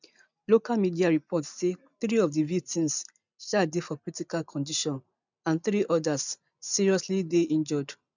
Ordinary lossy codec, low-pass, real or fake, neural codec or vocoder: none; 7.2 kHz; real; none